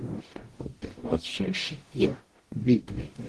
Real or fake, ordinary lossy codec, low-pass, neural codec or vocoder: fake; Opus, 16 kbps; 10.8 kHz; codec, 44.1 kHz, 0.9 kbps, DAC